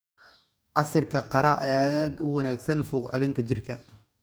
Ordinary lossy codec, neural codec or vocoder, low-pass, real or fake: none; codec, 44.1 kHz, 2.6 kbps, DAC; none; fake